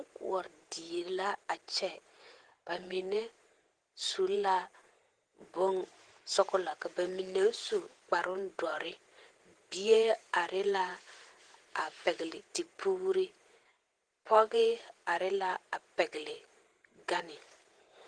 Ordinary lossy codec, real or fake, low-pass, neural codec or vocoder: Opus, 16 kbps; fake; 9.9 kHz; vocoder, 48 kHz, 128 mel bands, Vocos